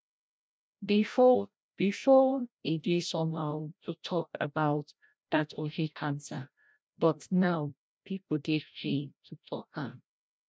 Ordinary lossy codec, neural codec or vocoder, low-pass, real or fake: none; codec, 16 kHz, 0.5 kbps, FreqCodec, larger model; none; fake